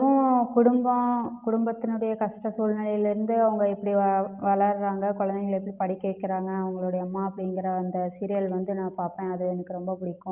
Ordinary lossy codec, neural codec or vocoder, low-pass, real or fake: Opus, 24 kbps; none; 3.6 kHz; real